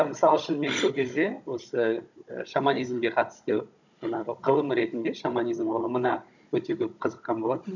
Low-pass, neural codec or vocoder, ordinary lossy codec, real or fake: 7.2 kHz; codec, 16 kHz, 16 kbps, FunCodec, trained on Chinese and English, 50 frames a second; none; fake